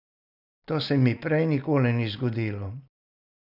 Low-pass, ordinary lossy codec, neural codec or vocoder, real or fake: 5.4 kHz; none; none; real